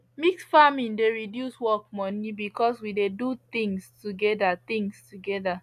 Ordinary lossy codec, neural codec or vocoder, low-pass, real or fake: AAC, 96 kbps; none; 14.4 kHz; real